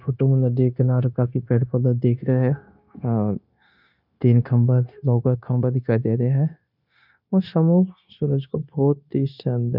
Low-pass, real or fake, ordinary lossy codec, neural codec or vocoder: 5.4 kHz; fake; none; codec, 16 kHz, 0.9 kbps, LongCat-Audio-Codec